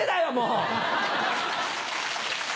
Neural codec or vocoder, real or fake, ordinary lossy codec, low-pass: none; real; none; none